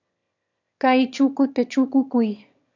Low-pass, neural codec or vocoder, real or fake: 7.2 kHz; autoencoder, 22.05 kHz, a latent of 192 numbers a frame, VITS, trained on one speaker; fake